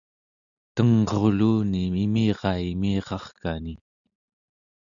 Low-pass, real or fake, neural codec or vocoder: 7.2 kHz; real; none